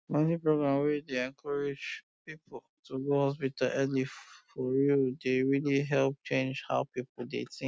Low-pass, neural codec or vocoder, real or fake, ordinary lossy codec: none; none; real; none